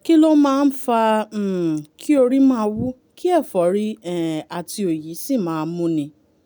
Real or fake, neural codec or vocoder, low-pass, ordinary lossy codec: real; none; none; none